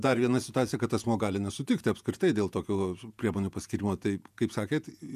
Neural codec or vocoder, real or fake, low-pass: vocoder, 48 kHz, 128 mel bands, Vocos; fake; 14.4 kHz